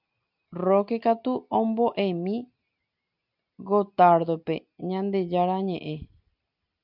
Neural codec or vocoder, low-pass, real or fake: none; 5.4 kHz; real